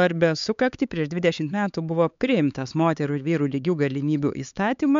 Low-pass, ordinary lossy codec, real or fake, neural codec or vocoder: 7.2 kHz; MP3, 64 kbps; fake; codec, 16 kHz, 4 kbps, X-Codec, HuBERT features, trained on LibriSpeech